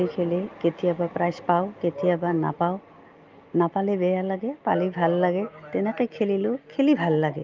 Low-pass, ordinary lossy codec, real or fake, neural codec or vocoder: 7.2 kHz; Opus, 24 kbps; real; none